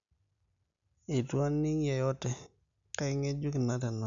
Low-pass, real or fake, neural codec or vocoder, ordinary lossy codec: 7.2 kHz; real; none; none